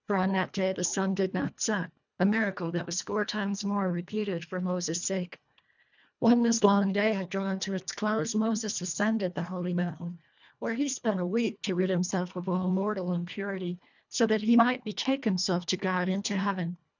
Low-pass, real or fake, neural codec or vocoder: 7.2 kHz; fake; codec, 24 kHz, 1.5 kbps, HILCodec